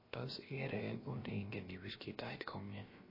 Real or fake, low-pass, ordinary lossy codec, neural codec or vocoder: fake; 5.4 kHz; MP3, 24 kbps; codec, 16 kHz, about 1 kbps, DyCAST, with the encoder's durations